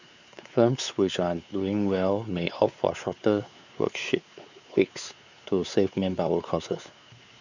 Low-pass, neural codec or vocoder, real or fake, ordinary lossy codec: 7.2 kHz; codec, 16 kHz, 4 kbps, X-Codec, WavLM features, trained on Multilingual LibriSpeech; fake; none